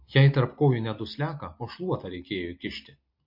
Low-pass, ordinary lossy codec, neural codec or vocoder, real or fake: 5.4 kHz; MP3, 32 kbps; none; real